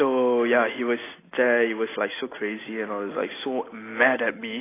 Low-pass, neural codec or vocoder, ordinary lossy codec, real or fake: 3.6 kHz; none; AAC, 16 kbps; real